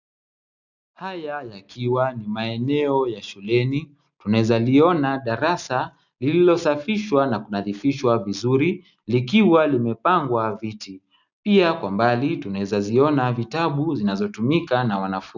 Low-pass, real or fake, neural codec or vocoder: 7.2 kHz; real; none